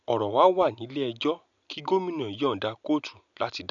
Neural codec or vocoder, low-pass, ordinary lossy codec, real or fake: none; 7.2 kHz; none; real